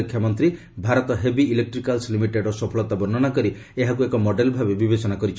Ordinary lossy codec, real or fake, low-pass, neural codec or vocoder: none; real; none; none